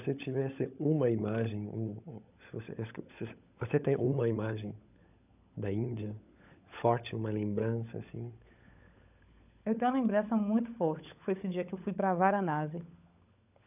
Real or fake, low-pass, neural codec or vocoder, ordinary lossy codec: fake; 3.6 kHz; codec, 16 kHz, 16 kbps, FunCodec, trained on LibriTTS, 50 frames a second; none